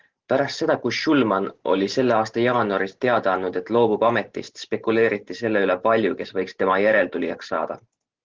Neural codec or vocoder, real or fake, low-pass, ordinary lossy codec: none; real; 7.2 kHz; Opus, 16 kbps